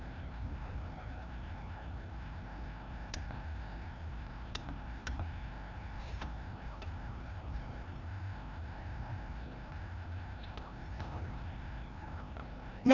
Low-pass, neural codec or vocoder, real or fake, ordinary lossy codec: 7.2 kHz; codec, 16 kHz, 1 kbps, FreqCodec, larger model; fake; none